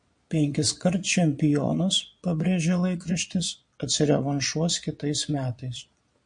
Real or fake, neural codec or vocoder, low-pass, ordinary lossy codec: fake; vocoder, 22.05 kHz, 80 mel bands, Vocos; 9.9 kHz; MP3, 48 kbps